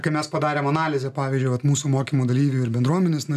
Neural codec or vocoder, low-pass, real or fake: none; 14.4 kHz; real